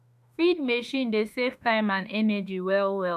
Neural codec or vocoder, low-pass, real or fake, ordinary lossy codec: autoencoder, 48 kHz, 32 numbers a frame, DAC-VAE, trained on Japanese speech; 14.4 kHz; fake; none